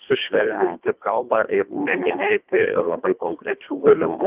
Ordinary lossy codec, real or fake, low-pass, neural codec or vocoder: Opus, 24 kbps; fake; 3.6 kHz; codec, 24 kHz, 1.5 kbps, HILCodec